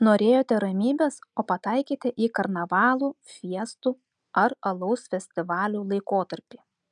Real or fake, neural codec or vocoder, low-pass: real; none; 9.9 kHz